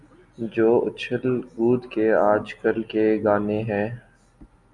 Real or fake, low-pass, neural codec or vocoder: real; 10.8 kHz; none